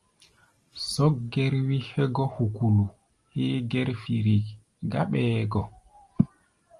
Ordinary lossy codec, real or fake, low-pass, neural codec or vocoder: Opus, 32 kbps; real; 10.8 kHz; none